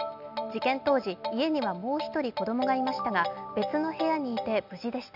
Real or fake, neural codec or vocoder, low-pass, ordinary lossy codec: real; none; 5.4 kHz; none